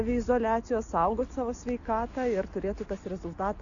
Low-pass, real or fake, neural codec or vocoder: 7.2 kHz; real; none